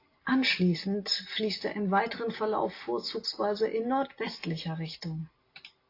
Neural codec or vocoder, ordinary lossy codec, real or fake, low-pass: none; AAC, 32 kbps; real; 5.4 kHz